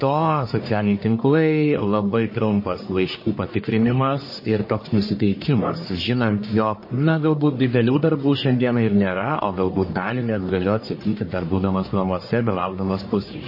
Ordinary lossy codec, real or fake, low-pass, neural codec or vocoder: MP3, 24 kbps; fake; 5.4 kHz; codec, 44.1 kHz, 1.7 kbps, Pupu-Codec